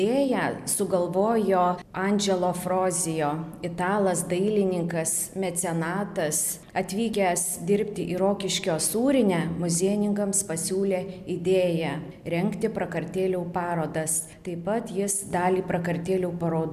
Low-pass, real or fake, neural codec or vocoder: 14.4 kHz; real; none